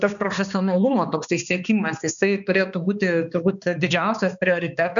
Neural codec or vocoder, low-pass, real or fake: codec, 16 kHz, 2 kbps, X-Codec, HuBERT features, trained on balanced general audio; 7.2 kHz; fake